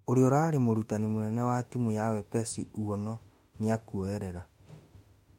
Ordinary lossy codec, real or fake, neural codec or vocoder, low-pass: MP3, 64 kbps; fake; autoencoder, 48 kHz, 32 numbers a frame, DAC-VAE, trained on Japanese speech; 19.8 kHz